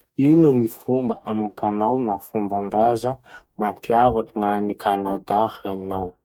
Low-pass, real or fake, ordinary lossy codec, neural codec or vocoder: 19.8 kHz; fake; MP3, 96 kbps; codec, 44.1 kHz, 2.6 kbps, DAC